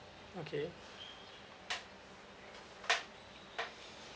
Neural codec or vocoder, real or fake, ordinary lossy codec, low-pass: none; real; none; none